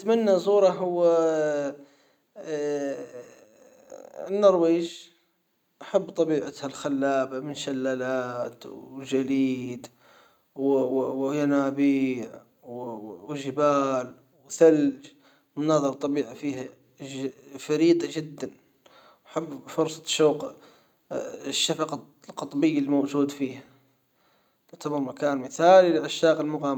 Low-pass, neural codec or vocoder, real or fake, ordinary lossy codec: 19.8 kHz; none; real; none